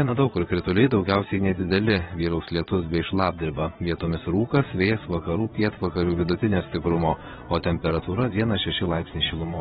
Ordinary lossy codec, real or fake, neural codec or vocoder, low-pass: AAC, 16 kbps; fake; vocoder, 22.05 kHz, 80 mel bands, Vocos; 9.9 kHz